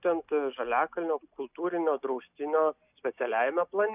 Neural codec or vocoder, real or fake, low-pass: none; real; 3.6 kHz